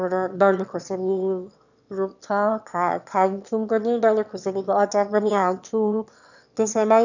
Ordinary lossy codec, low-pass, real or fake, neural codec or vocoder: none; 7.2 kHz; fake; autoencoder, 22.05 kHz, a latent of 192 numbers a frame, VITS, trained on one speaker